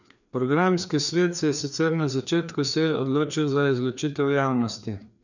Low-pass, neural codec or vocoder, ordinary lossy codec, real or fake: 7.2 kHz; codec, 16 kHz, 2 kbps, FreqCodec, larger model; none; fake